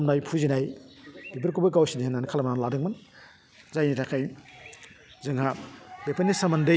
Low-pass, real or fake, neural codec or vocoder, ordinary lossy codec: none; real; none; none